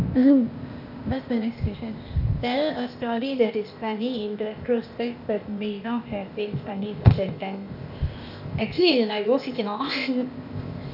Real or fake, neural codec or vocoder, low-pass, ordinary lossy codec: fake; codec, 16 kHz, 0.8 kbps, ZipCodec; 5.4 kHz; none